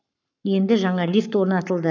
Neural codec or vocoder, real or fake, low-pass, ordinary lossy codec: codec, 44.1 kHz, 7.8 kbps, Pupu-Codec; fake; 7.2 kHz; none